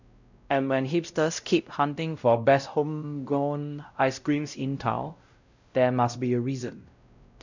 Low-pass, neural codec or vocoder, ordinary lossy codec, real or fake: 7.2 kHz; codec, 16 kHz, 0.5 kbps, X-Codec, WavLM features, trained on Multilingual LibriSpeech; none; fake